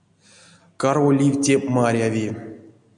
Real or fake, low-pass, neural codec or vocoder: real; 9.9 kHz; none